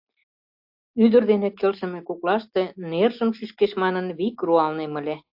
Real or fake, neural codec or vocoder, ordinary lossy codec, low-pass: real; none; AAC, 48 kbps; 5.4 kHz